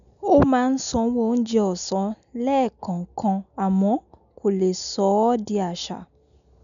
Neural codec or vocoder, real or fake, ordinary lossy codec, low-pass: none; real; none; 7.2 kHz